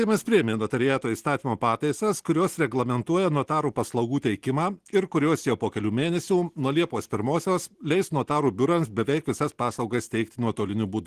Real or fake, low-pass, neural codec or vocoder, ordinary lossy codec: real; 14.4 kHz; none; Opus, 16 kbps